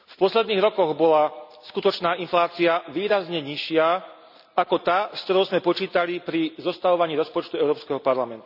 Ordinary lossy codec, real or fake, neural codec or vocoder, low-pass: none; real; none; 5.4 kHz